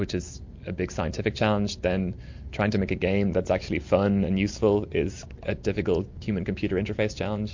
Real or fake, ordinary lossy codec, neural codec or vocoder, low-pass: real; MP3, 48 kbps; none; 7.2 kHz